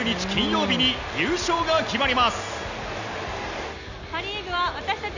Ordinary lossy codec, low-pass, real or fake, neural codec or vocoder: none; 7.2 kHz; real; none